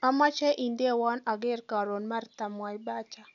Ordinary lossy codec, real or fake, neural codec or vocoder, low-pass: none; real; none; 7.2 kHz